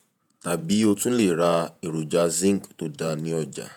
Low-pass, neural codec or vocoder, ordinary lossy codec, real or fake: none; vocoder, 48 kHz, 128 mel bands, Vocos; none; fake